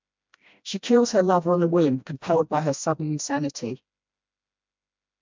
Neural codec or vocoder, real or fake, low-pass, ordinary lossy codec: codec, 16 kHz, 1 kbps, FreqCodec, smaller model; fake; 7.2 kHz; MP3, 64 kbps